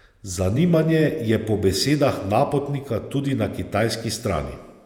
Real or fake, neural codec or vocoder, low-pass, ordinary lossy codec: fake; vocoder, 48 kHz, 128 mel bands, Vocos; 19.8 kHz; none